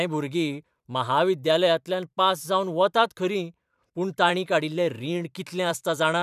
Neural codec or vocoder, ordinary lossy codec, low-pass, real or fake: vocoder, 44.1 kHz, 128 mel bands every 512 samples, BigVGAN v2; none; 14.4 kHz; fake